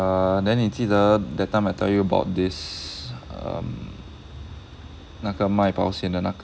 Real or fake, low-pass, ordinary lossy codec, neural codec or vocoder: real; none; none; none